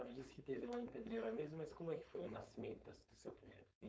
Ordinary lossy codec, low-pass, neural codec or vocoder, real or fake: none; none; codec, 16 kHz, 4.8 kbps, FACodec; fake